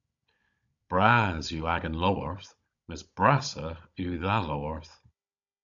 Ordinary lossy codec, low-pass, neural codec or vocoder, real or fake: AAC, 64 kbps; 7.2 kHz; codec, 16 kHz, 16 kbps, FunCodec, trained on Chinese and English, 50 frames a second; fake